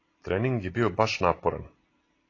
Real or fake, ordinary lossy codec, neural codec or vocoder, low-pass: fake; AAC, 32 kbps; vocoder, 44.1 kHz, 128 mel bands every 256 samples, BigVGAN v2; 7.2 kHz